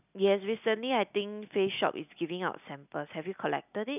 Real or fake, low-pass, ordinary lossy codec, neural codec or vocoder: real; 3.6 kHz; none; none